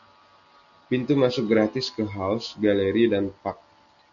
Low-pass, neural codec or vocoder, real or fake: 7.2 kHz; none; real